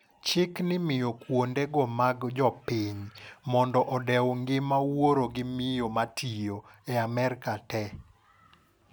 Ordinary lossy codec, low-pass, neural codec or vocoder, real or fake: none; none; none; real